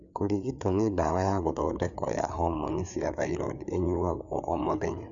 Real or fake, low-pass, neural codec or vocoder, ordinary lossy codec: fake; 7.2 kHz; codec, 16 kHz, 4 kbps, FreqCodec, larger model; none